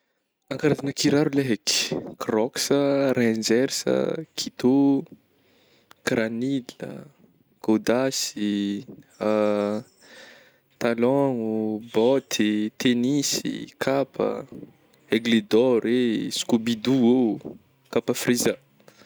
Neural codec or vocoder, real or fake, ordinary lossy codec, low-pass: none; real; none; none